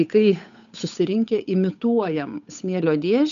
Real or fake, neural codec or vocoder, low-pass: fake; codec, 16 kHz, 8 kbps, FunCodec, trained on Chinese and English, 25 frames a second; 7.2 kHz